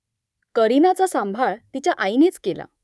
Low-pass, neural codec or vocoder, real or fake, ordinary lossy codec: none; codec, 24 kHz, 3.1 kbps, DualCodec; fake; none